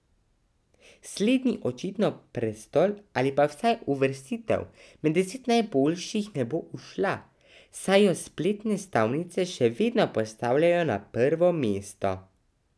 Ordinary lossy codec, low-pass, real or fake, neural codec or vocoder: none; none; real; none